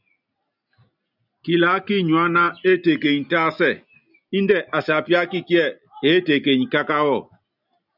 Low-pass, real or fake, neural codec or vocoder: 5.4 kHz; real; none